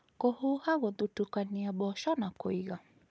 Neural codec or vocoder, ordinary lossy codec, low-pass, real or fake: none; none; none; real